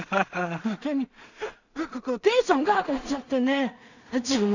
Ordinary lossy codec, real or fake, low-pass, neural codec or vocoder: none; fake; 7.2 kHz; codec, 16 kHz in and 24 kHz out, 0.4 kbps, LongCat-Audio-Codec, two codebook decoder